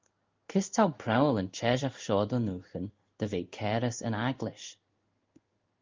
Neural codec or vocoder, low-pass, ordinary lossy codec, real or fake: codec, 16 kHz in and 24 kHz out, 1 kbps, XY-Tokenizer; 7.2 kHz; Opus, 24 kbps; fake